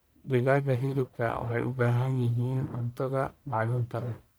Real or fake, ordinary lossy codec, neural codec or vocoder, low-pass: fake; none; codec, 44.1 kHz, 1.7 kbps, Pupu-Codec; none